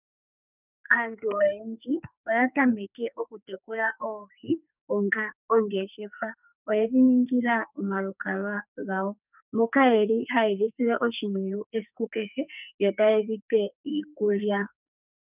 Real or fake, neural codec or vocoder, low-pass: fake; codec, 44.1 kHz, 2.6 kbps, SNAC; 3.6 kHz